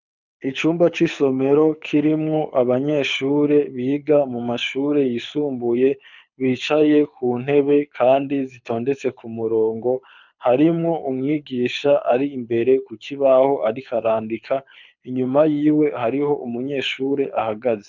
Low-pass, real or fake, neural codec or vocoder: 7.2 kHz; fake; codec, 24 kHz, 6 kbps, HILCodec